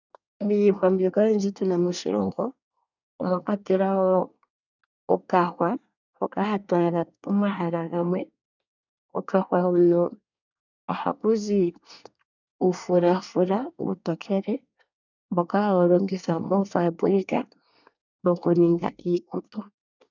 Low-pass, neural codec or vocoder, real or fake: 7.2 kHz; codec, 24 kHz, 1 kbps, SNAC; fake